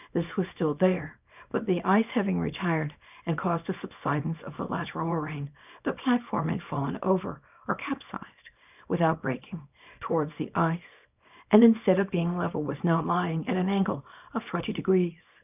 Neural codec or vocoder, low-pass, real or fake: codec, 24 kHz, 0.9 kbps, WavTokenizer, small release; 3.6 kHz; fake